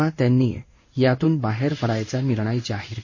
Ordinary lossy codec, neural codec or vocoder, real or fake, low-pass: MP3, 32 kbps; codec, 16 kHz in and 24 kHz out, 1 kbps, XY-Tokenizer; fake; 7.2 kHz